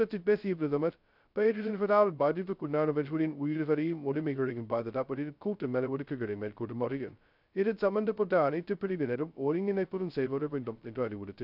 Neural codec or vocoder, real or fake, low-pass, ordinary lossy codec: codec, 16 kHz, 0.2 kbps, FocalCodec; fake; 5.4 kHz; none